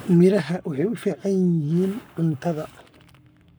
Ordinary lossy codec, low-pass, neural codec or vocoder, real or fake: none; none; codec, 44.1 kHz, 7.8 kbps, Pupu-Codec; fake